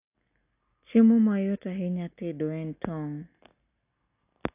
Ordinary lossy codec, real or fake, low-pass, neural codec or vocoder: AAC, 32 kbps; real; 3.6 kHz; none